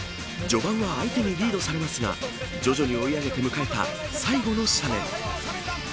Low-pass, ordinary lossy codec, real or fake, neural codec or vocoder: none; none; real; none